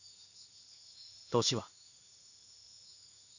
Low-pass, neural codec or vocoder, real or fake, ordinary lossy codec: 7.2 kHz; codec, 16 kHz in and 24 kHz out, 1 kbps, XY-Tokenizer; fake; none